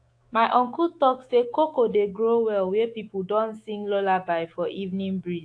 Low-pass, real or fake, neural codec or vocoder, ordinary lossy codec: 9.9 kHz; fake; autoencoder, 48 kHz, 128 numbers a frame, DAC-VAE, trained on Japanese speech; AAC, 48 kbps